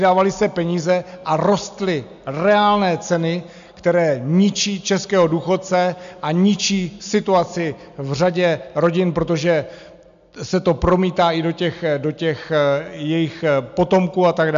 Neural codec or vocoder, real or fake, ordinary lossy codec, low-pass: none; real; MP3, 64 kbps; 7.2 kHz